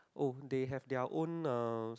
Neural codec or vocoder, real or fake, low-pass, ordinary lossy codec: none; real; none; none